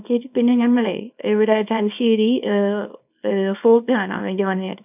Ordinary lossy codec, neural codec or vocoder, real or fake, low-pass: none; codec, 24 kHz, 0.9 kbps, WavTokenizer, small release; fake; 3.6 kHz